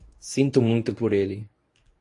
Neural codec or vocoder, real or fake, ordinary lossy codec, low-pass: codec, 24 kHz, 0.9 kbps, WavTokenizer, medium speech release version 1; fake; AAC, 48 kbps; 10.8 kHz